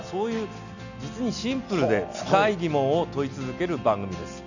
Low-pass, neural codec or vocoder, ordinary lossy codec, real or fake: 7.2 kHz; none; none; real